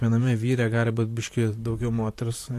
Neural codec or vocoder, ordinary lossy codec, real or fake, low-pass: vocoder, 44.1 kHz, 128 mel bands, Pupu-Vocoder; AAC, 64 kbps; fake; 14.4 kHz